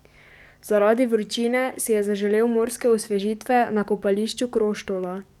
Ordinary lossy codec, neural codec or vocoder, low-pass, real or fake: none; codec, 44.1 kHz, 7.8 kbps, DAC; 19.8 kHz; fake